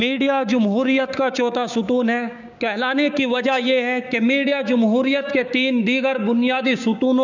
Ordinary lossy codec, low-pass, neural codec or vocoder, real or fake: none; 7.2 kHz; codec, 16 kHz, 6 kbps, DAC; fake